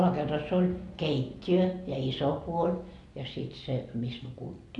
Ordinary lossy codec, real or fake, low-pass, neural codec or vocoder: AAC, 48 kbps; real; 10.8 kHz; none